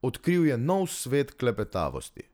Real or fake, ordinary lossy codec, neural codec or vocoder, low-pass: real; none; none; none